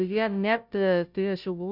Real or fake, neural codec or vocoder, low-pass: fake; codec, 16 kHz, 0.5 kbps, FunCodec, trained on Chinese and English, 25 frames a second; 5.4 kHz